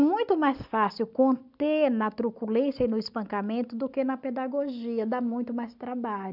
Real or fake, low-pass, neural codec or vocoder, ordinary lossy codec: real; 5.4 kHz; none; none